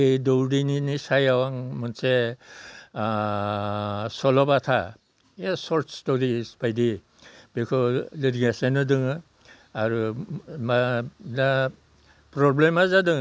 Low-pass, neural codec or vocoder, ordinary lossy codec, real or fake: none; none; none; real